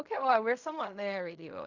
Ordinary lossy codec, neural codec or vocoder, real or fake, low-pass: none; codec, 16 kHz in and 24 kHz out, 0.4 kbps, LongCat-Audio-Codec, fine tuned four codebook decoder; fake; 7.2 kHz